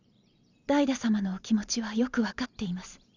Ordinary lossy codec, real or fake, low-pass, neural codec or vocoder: none; fake; 7.2 kHz; vocoder, 44.1 kHz, 128 mel bands every 512 samples, BigVGAN v2